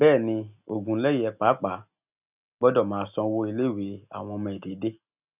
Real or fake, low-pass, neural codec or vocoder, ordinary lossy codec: real; 3.6 kHz; none; none